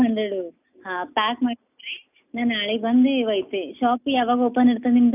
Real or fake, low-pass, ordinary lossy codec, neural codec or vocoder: real; 3.6 kHz; none; none